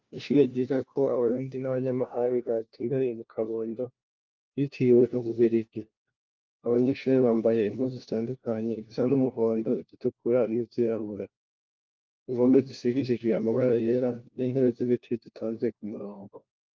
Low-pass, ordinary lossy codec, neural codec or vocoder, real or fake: 7.2 kHz; Opus, 32 kbps; codec, 16 kHz, 1 kbps, FunCodec, trained on LibriTTS, 50 frames a second; fake